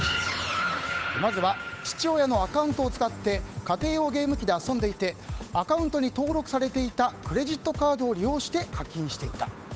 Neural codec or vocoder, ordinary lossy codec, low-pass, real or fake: codec, 16 kHz, 8 kbps, FunCodec, trained on Chinese and English, 25 frames a second; none; none; fake